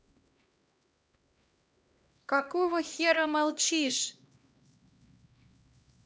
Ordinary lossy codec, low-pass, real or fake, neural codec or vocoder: none; none; fake; codec, 16 kHz, 1 kbps, X-Codec, HuBERT features, trained on LibriSpeech